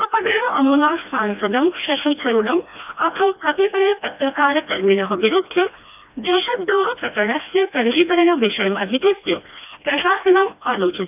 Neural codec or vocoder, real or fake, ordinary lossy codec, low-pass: codec, 16 kHz, 1 kbps, FreqCodec, smaller model; fake; none; 3.6 kHz